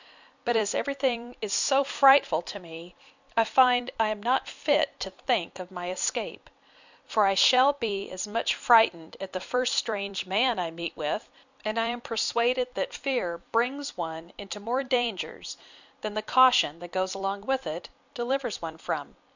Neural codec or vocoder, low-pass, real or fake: vocoder, 44.1 kHz, 128 mel bands every 256 samples, BigVGAN v2; 7.2 kHz; fake